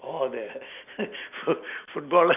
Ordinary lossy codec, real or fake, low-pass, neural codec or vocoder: none; real; 3.6 kHz; none